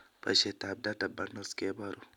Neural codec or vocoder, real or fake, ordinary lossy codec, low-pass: none; real; none; 19.8 kHz